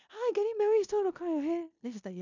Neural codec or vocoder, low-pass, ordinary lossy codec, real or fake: codec, 16 kHz in and 24 kHz out, 0.9 kbps, LongCat-Audio-Codec, four codebook decoder; 7.2 kHz; none; fake